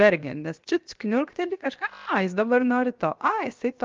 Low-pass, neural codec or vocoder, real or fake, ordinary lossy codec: 7.2 kHz; codec, 16 kHz, 0.7 kbps, FocalCodec; fake; Opus, 24 kbps